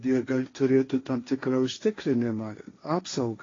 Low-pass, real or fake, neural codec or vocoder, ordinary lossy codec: 7.2 kHz; fake; codec, 16 kHz, 1.1 kbps, Voila-Tokenizer; AAC, 32 kbps